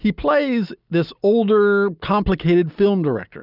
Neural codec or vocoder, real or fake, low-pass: none; real; 5.4 kHz